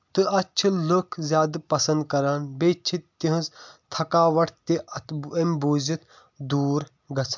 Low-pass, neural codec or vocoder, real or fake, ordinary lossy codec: 7.2 kHz; none; real; MP3, 64 kbps